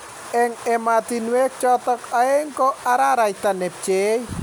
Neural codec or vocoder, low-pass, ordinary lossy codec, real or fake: none; none; none; real